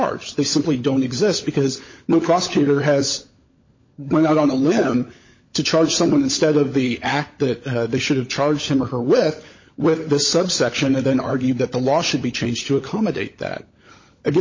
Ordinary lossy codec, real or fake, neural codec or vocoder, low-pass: MP3, 32 kbps; fake; codec, 16 kHz, 16 kbps, FunCodec, trained on LibriTTS, 50 frames a second; 7.2 kHz